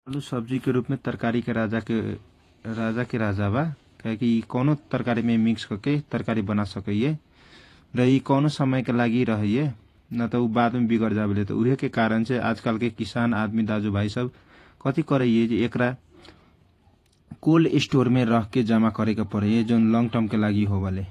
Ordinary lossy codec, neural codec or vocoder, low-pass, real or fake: AAC, 48 kbps; vocoder, 48 kHz, 128 mel bands, Vocos; 14.4 kHz; fake